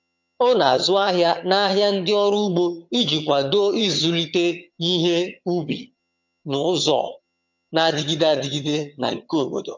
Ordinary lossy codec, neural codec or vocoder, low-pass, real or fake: MP3, 48 kbps; vocoder, 22.05 kHz, 80 mel bands, HiFi-GAN; 7.2 kHz; fake